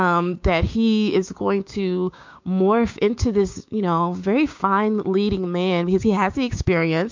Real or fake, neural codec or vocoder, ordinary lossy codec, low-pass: fake; codec, 16 kHz, 6 kbps, DAC; MP3, 48 kbps; 7.2 kHz